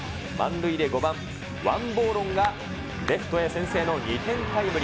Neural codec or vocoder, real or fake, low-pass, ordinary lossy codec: none; real; none; none